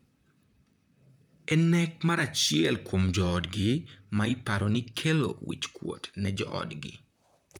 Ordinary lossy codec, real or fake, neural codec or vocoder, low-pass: none; fake; vocoder, 44.1 kHz, 128 mel bands, Pupu-Vocoder; 19.8 kHz